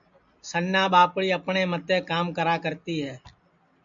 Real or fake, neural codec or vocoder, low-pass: real; none; 7.2 kHz